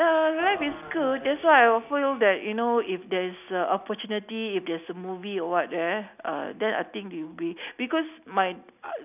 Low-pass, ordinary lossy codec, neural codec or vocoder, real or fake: 3.6 kHz; none; none; real